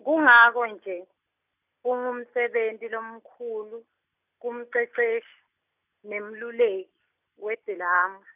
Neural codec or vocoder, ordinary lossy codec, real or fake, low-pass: none; none; real; 3.6 kHz